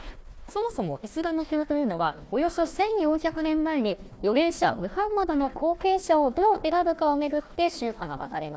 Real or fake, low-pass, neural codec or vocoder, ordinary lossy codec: fake; none; codec, 16 kHz, 1 kbps, FunCodec, trained on Chinese and English, 50 frames a second; none